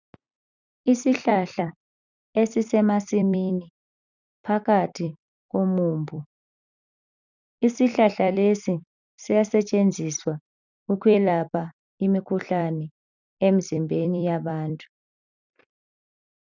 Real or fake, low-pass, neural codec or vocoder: fake; 7.2 kHz; vocoder, 44.1 kHz, 128 mel bands every 256 samples, BigVGAN v2